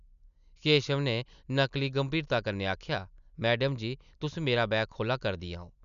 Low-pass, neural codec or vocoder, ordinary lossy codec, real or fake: 7.2 kHz; none; none; real